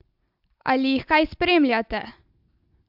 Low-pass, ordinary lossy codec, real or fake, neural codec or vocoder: 5.4 kHz; AAC, 48 kbps; real; none